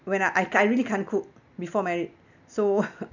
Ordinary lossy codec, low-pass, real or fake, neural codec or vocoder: none; 7.2 kHz; real; none